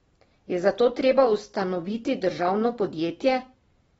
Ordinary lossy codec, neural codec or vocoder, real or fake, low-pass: AAC, 24 kbps; none; real; 14.4 kHz